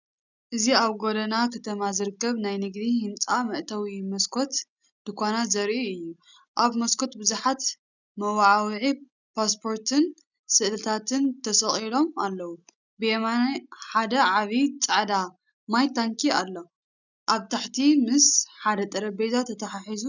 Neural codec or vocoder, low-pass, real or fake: none; 7.2 kHz; real